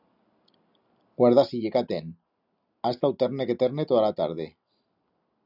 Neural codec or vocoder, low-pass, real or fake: none; 5.4 kHz; real